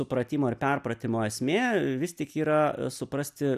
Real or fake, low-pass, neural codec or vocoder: real; 14.4 kHz; none